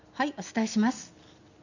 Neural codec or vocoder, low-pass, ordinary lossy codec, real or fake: none; 7.2 kHz; none; real